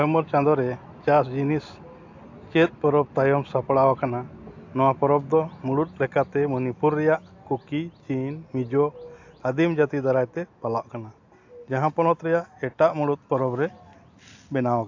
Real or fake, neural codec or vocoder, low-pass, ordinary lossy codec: real; none; 7.2 kHz; AAC, 48 kbps